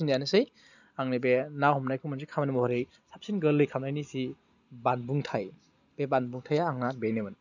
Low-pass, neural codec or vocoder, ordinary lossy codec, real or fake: 7.2 kHz; none; none; real